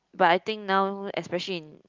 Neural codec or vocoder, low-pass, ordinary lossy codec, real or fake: none; 7.2 kHz; Opus, 24 kbps; real